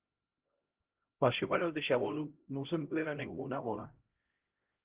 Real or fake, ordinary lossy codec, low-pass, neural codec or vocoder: fake; Opus, 16 kbps; 3.6 kHz; codec, 16 kHz, 0.5 kbps, X-Codec, HuBERT features, trained on LibriSpeech